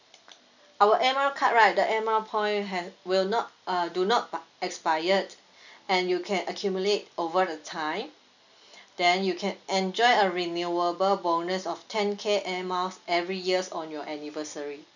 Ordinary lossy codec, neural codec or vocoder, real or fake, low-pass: none; none; real; 7.2 kHz